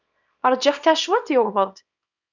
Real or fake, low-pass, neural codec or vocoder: fake; 7.2 kHz; codec, 24 kHz, 0.9 kbps, WavTokenizer, small release